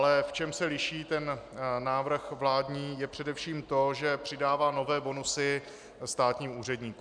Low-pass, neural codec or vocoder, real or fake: 9.9 kHz; none; real